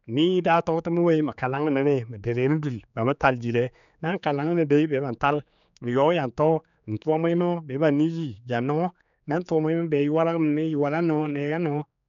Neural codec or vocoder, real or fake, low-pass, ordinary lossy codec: codec, 16 kHz, 4 kbps, X-Codec, HuBERT features, trained on general audio; fake; 7.2 kHz; none